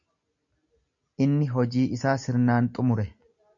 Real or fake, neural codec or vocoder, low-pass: real; none; 7.2 kHz